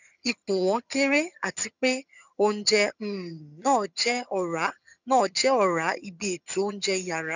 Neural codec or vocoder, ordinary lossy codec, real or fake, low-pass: vocoder, 22.05 kHz, 80 mel bands, HiFi-GAN; none; fake; 7.2 kHz